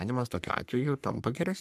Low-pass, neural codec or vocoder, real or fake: 14.4 kHz; codec, 44.1 kHz, 3.4 kbps, Pupu-Codec; fake